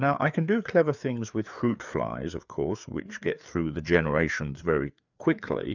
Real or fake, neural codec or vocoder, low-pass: fake; codec, 16 kHz, 4 kbps, FreqCodec, larger model; 7.2 kHz